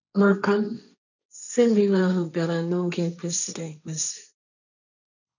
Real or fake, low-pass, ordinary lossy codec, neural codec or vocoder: fake; 7.2 kHz; none; codec, 16 kHz, 1.1 kbps, Voila-Tokenizer